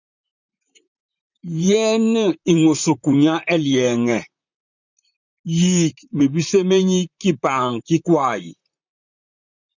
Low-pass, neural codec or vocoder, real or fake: 7.2 kHz; vocoder, 44.1 kHz, 128 mel bands, Pupu-Vocoder; fake